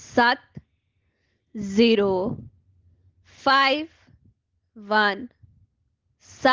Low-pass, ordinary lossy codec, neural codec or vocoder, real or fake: 7.2 kHz; Opus, 16 kbps; none; real